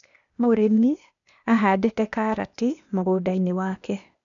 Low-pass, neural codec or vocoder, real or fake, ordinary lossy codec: 7.2 kHz; codec, 16 kHz, 0.8 kbps, ZipCodec; fake; none